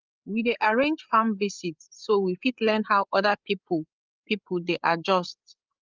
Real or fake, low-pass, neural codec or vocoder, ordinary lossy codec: fake; 7.2 kHz; codec, 16 kHz, 8 kbps, FreqCodec, larger model; Opus, 24 kbps